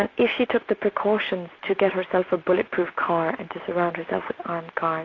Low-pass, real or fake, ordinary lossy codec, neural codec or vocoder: 7.2 kHz; real; AAC, 32 kbps; none